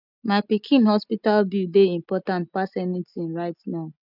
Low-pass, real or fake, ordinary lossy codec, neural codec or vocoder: 5.4 kHz; real; none; none